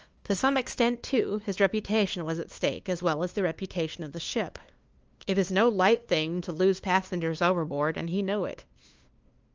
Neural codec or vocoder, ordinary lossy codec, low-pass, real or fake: codec, 16 kHz, 2 kbps, FunCodec, trained on LibriTTS, 25 frames a second; Opus, 24 kbps; 7.2 kHz; fake